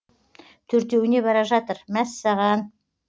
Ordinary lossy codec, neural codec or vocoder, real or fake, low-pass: none; none; real; none